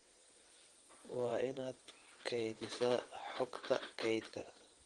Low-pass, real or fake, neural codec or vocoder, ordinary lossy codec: 9.9 kHz; fake; vocoder, 22.05 kHz, 80 mel bands, WaveNeXt; Opus, 24 kbps